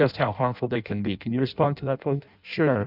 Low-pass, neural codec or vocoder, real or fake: 5.4 kHz; codec, 16 kHz in and 24 kHz out, 0.6 kbps, FireRedTTS-2 codec; fake